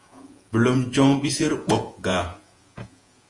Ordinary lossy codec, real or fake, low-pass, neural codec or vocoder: Opus, 32 kbps; fake; 10.8 kHz; vocoder, 48 kHz, 128 mel bands, Vocos